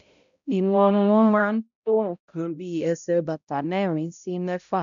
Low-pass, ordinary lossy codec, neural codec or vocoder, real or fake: 7.2 kHz; none; codec, 16 kHz, 0.5 kbps, X-Codec, HuBERT features, trained on balanced general audio; fake